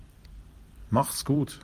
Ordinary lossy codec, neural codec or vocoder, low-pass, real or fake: Opus, 32 kbps; vocoder, 44.1 kHz, 128 mel bands every 256 samples, BigVGAN v2; 14.4 kHz; fake